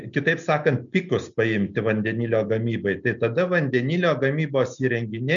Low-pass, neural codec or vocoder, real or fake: 7.2 kHz; none; real